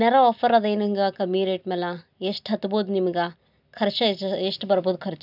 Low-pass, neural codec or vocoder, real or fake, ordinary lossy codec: 5.4 kHz; none; real; none